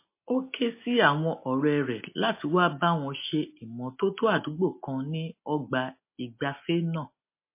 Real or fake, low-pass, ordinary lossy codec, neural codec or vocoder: real; 3.6 kHz; MP3, 24 kbps; none